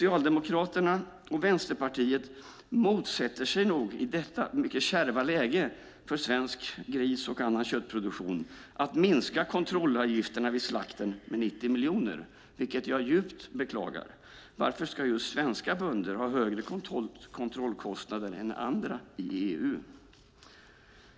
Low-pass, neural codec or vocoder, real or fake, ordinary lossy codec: none; none; real; none